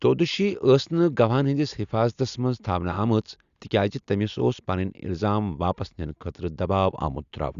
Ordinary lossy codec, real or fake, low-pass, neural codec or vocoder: Opus, 64 kbps; real; 7.2 kHz; none